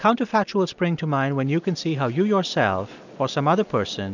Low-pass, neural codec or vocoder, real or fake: 7.2 kHz; none; real